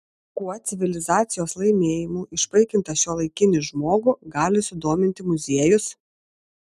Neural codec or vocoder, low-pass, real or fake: none; 14.4 kHz; real